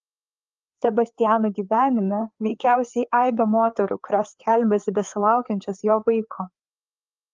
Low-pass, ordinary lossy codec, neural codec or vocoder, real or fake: 7.2 kHz; Opus, 32 kbps; codec, 16 kHz, 4 kbps, FreqCodec, larger model; fake